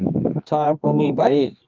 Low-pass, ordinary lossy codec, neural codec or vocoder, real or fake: 7.2 kHz; Opus, 24 kbps; codec, 24 kHz, 0.9 kbps, WavTokenizer, medium music audio release; fake